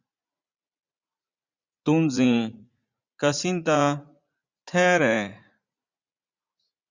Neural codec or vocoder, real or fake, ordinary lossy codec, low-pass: vocoder, 44.1 kHz, 80 mel bands, Vocos; fake; Opus, 64 kbps; 7.2 kHz